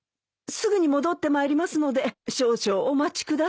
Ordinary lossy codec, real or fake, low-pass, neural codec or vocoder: none; real; none; none